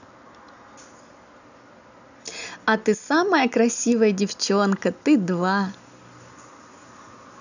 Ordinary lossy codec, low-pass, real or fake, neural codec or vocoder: none; 7.2 kHz; real; none